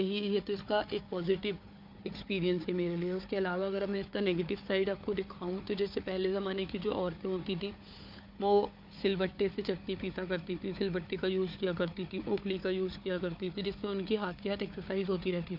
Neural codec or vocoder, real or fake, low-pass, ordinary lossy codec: codec, 16 kHz, 4 kbps, FunCodec, trained on Chinese and English, 50 frames a second; fake; 5.4 kHz; MP3, 48 kbps